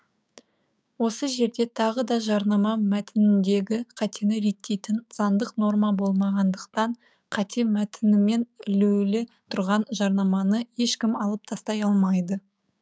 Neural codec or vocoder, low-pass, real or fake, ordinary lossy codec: codec, 16 kHz, 6 kbps, DAC; none; fake; none